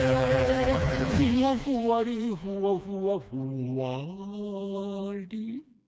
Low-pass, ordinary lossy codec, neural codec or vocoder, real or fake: none; none; codec, 16 kHz, 2 kbps, FreqCodec, smaller model; fake